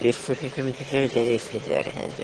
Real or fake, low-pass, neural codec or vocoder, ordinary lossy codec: fake; 9.9 kHz; autoencoder, 22.05 kHz, a latent of 192 numbers a frame, VITS, trained on one speaker; Opus, 16 kbps